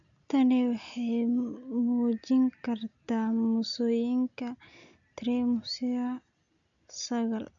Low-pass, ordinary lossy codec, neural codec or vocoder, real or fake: 7.2 kHz; none; none; real